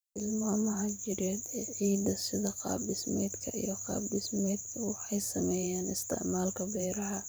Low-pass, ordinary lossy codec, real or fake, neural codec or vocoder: none; none; fake; vocoder, 44.1 kHz, 128 mel bands every 512 samples, BigVGAN v2